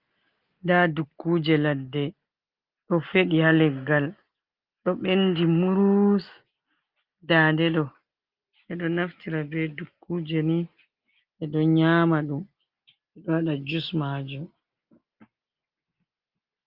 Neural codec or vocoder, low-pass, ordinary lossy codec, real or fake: none; 5.4 kHz; Opus, 32 kbps; real